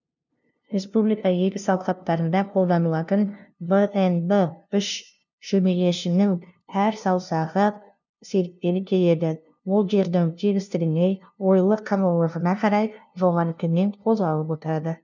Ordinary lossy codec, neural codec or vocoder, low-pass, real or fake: none; codec, 16 kHz, 0.5 kbps, FunCodec, trained on LibriTTS, 25 frames a second; 7.2 kHz; fake